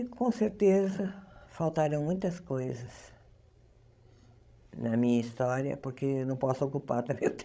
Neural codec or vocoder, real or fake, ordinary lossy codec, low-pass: codec, 16 kHz, 16 kbps, FreqCodec, larger model; fake; none; none